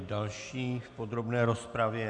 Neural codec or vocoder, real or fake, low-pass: none; real; 10.8 kHz